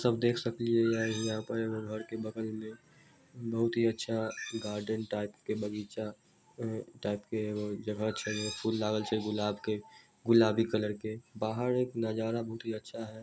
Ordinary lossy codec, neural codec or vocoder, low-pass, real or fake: none; none; none; real